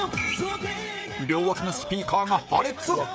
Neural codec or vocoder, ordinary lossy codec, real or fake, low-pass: codec, 16 kHz, 16 kbps, FreqCodec, smaller model; none; fake; none